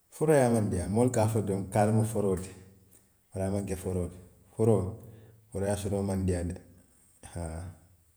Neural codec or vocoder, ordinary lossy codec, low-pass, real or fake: vocoder, 48 kHz, 128 mel bands, Vocos; none; none; fake